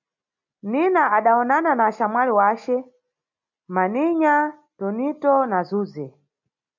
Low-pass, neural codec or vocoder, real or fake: 7.2 kHz; none; real